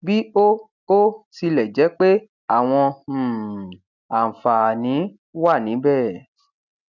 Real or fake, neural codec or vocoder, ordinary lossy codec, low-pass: real; none; none; 7.2 kHz